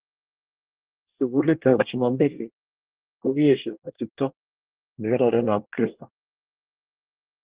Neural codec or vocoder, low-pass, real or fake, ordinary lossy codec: codec, 24 kHz, 1 kbps, SNAC; 3.6 kHz; fake; Opus, 16 kbps